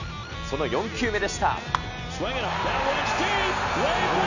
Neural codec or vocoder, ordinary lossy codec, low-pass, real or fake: none; none; 7.2 kHz; real